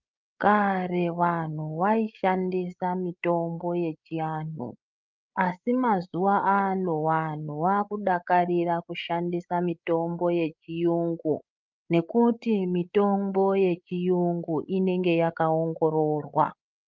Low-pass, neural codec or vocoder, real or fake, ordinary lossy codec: 7.2 kHz; codec, 16 kHz, 16 kbps, FreqCodec, larger model; fake; Opus, 24 kbps